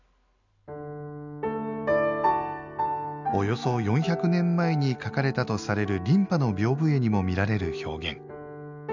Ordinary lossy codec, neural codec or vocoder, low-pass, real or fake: none; none; 7.2 kHz; real